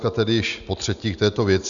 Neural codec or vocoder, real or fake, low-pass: none; real; 7.2 kHz